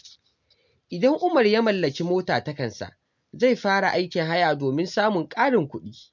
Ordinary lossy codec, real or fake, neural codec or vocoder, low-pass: MP3, 64 kbps; real; none; 7.2 kHz